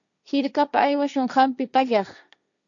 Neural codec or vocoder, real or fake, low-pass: codec, 16 kHz, 1.1 kbps, Voila-Tokenizer; fake; 7.2 kHz